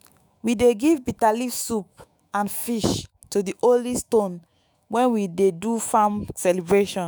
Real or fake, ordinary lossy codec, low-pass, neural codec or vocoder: fake; none; none; autoencoder, 48 kHz, 128 numbers a frame, DAC-VAE, trained on Japanese speech